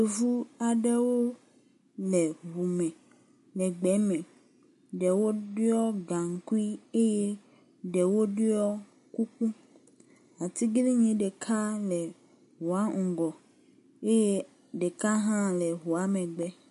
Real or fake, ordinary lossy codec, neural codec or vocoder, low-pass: real; MP3, 48 kbps; none; 14.4 kHz